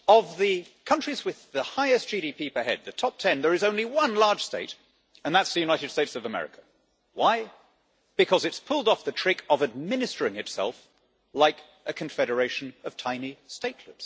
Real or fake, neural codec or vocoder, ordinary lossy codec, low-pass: real; none; none; none